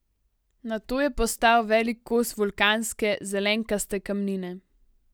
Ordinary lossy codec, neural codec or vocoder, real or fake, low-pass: none; none; real; none